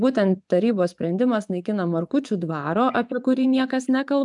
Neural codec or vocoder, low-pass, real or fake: vocoder, 24 kHz, 100 mel bands, Vocos; 10.8 kHz; fake